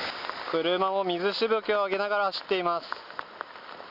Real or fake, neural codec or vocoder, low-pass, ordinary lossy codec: real; none; 5.4 kHz; none